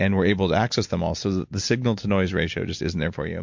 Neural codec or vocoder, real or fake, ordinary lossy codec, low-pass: none; real; MP3, 48 kbps; 7.2 kHz